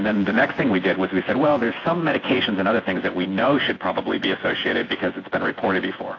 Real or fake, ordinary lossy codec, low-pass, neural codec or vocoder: fake; AAC, 32 kbps; 7.2 kHz; vocoder, 24 kHz, 100 mel bands, Vocos